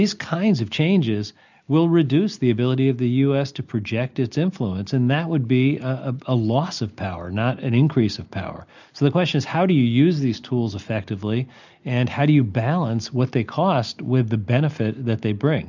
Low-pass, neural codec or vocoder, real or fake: 7.2 kHz; none; real